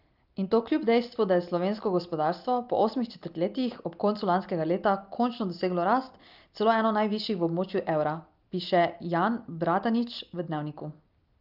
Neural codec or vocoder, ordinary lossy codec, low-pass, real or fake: none; Opus, 24 kbps; 5.4 kHz; real